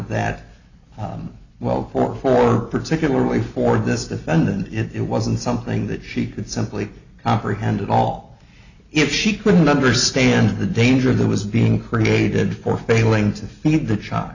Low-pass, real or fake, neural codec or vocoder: 7.2 kHz; real; none